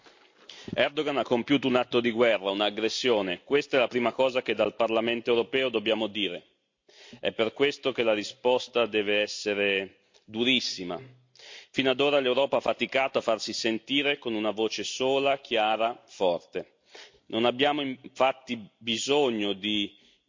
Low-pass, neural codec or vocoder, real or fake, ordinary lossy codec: 7.2 kHz; none; real; MP3, 48 kbps